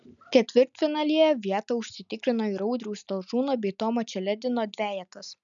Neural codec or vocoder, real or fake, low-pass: none; real; 7.2 kHz